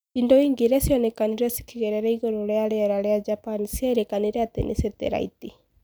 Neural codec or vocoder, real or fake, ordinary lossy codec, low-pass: none; real; none; none